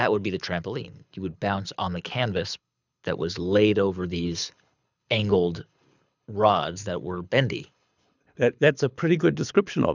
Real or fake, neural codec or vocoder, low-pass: fake; codec, 24 kHz, 6 kbps, HILCodec; 7.2 kHz